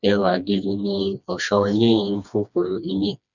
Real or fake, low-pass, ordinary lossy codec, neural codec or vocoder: fake; 7.2 kHz; none; codec, 16 kHz, 2 kbps, FreqCodec, smaller model